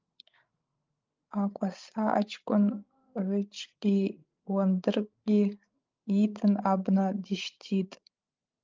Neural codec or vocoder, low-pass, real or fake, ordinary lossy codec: codec, 16 kHz, 16 kbps, FreqCodec, larger model; 7.2 kHz; fake; Opus, 32 kbps